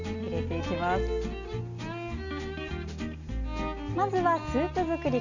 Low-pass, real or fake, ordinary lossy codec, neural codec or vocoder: 7.2 kHz; real; none; none